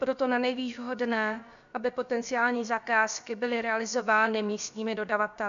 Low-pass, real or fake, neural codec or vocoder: 7.2 kHz; fake; codec, 16 kHz, about 1 kbps, DyCAST, with the encoder's durations